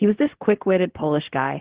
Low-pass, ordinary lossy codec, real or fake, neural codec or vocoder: 3.6 kHz; Opus, 16 kbps; fake; codec, 16 kHz, 0.4 kbps, LongCat-Audio-Codec